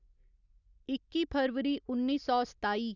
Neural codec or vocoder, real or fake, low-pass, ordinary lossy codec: none; real; 7.2 kHz; none